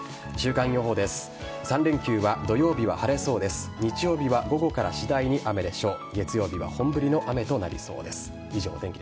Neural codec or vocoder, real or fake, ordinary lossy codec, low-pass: none; real; none; none